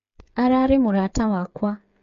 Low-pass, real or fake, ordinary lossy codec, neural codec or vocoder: 7.2 kHz; fake; MP3, 48 kbps; codec, 16 kHz, 8 kbps, FreqCodec, smaller model